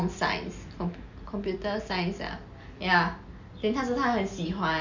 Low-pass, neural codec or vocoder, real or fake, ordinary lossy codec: 7.2 kHz; none; real; none